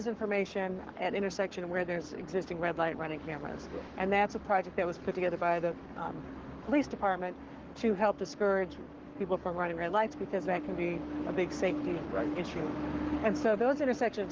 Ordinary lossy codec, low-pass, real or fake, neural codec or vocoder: Opus, 16 kbps; 7.2 kHz; fake; codec, 44.1 kHz, 7.8 kbps, Pupu-Codec